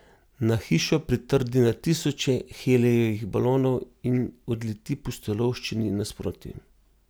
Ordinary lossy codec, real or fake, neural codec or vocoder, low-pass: none; real; none; none